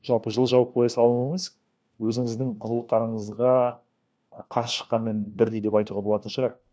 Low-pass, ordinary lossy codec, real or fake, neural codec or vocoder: none; none; fake; codec, 16 kHz, 1 kbps, FunCodec, trained on LibriTTS, 50 frames a second